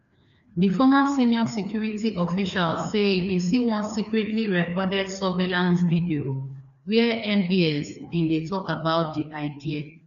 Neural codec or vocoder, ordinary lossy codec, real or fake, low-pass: codec, 16 kHz, 2 kbps, FreqCodec, larger model; none; fake; 7.2 kHz